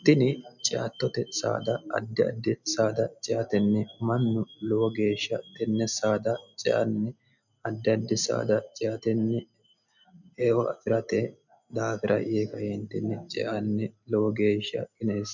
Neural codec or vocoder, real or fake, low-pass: none; real; 7.2 kHz